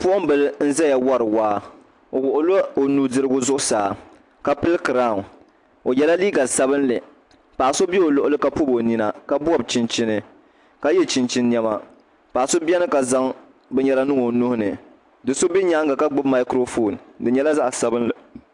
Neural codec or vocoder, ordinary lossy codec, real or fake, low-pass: none; AAC, 64 kbps; real; 10.8 kHz